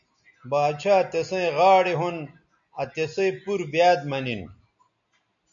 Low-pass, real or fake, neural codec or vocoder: 7.2 kHz; real; none